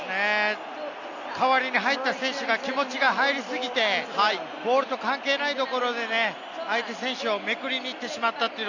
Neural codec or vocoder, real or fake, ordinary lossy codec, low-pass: none; real; none; 7.2 kHz